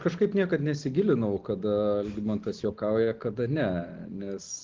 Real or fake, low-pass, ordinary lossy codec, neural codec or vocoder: real; 7.2 kHz; Opus, 16 kbps; none